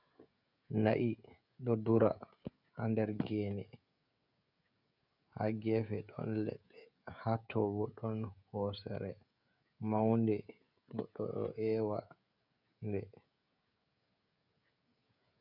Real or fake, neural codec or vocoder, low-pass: fake; codec, 16 kHz, 16 kbps, FreqCodec, smaller model; 5.4 kHz